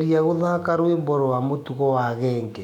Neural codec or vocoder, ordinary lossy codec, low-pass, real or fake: autoencoder, 48 kHz, 128 numbers a frame, DAC-VAE, trained on Japanese speech; none; 19.8 kHz; fake